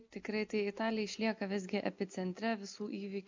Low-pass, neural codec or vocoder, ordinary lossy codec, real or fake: 7.2 kHz; none; MP3, 64 kbps; real